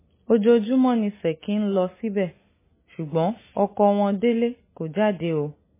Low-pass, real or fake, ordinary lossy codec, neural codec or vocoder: 3.6 kHz; real; MP3, 16 kbps; none